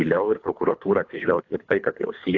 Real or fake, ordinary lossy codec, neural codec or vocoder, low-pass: fake; AAC, 48 kbps; codec, 24 kHz, 3 kbps, HILCodec; 7.2 kHz